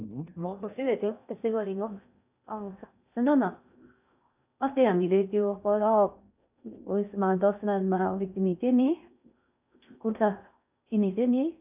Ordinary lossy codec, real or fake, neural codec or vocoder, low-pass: none; fake; codec, 16 kHz in and 24 kHz out, 0.6 kbps, FocalCodec, streaming, 4096 codes; 3.6 kHz